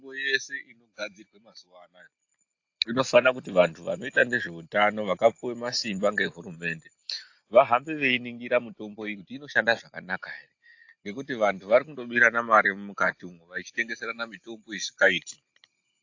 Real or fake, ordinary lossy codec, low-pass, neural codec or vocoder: real; AAC, 48 kbps; 7.2 kHz; none